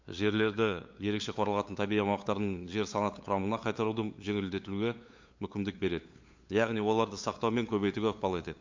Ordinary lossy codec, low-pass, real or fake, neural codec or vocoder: MP3, 48 kbps; 7.2 kHz; fake; codec, 16 kHz, 8 kbps, FunCodec, trained on LibriTTS, 25 frames a second